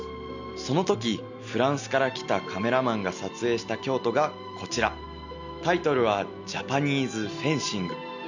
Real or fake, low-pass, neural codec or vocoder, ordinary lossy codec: real; 7.2 kHz; none; none